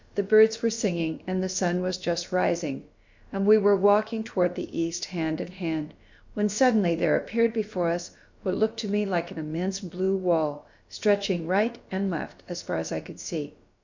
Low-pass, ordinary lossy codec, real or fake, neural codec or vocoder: 7.2 kHz; MP3, 64 kbps; fake; codec, 16 kHz, about 1 kbps, DyCAST, with the encoder's durations